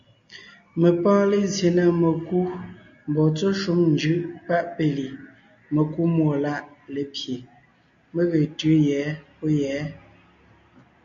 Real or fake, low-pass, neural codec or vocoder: real; 7.2 kHz; none